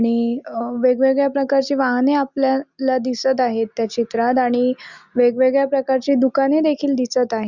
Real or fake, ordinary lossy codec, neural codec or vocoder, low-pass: real; Opus, 64 kbps; none; 7.2 kHz